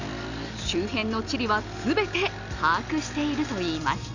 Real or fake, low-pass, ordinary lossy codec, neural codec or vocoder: real; 7.2 kHz; none; none